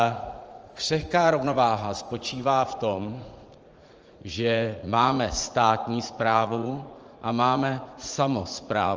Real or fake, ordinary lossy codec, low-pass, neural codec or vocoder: fake; Opus, 24 kbps; 7.2 kHz; vocoder, 44.1 kHz, 80 mel bands, Vocos